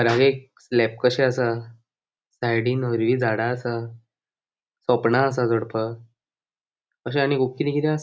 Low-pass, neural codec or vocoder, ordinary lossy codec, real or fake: none; none; none; real